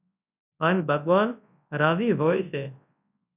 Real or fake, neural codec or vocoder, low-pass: fake; codec, 24 kHz, 0.9 kbps, WavTokenizer, large speech release; 3.6 kHz